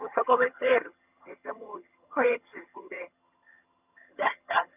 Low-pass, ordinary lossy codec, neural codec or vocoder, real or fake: 3.6 kHz; none; vocoder, 22.05 kHz, 80 mel bands, HiFi-GAN; fake